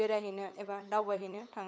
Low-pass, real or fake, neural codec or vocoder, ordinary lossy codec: none; fake; codec, 16 kHz, 8 kbps, FunCodec, trained on LibriTTS, 25 frames a second; none